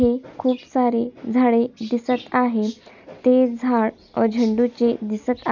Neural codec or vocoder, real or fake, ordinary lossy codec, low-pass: none; real; none; 7.2 kHz